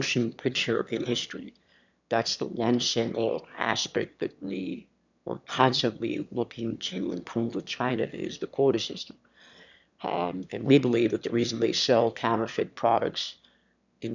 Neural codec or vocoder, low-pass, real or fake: autoencoder, 22.05 kHz, a latent of 192 numbers a frame, VITS, trained on one speaker; 7.2 kHz; fake